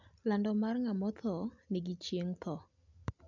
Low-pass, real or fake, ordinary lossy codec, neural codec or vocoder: 7.2 kHz; real; none; none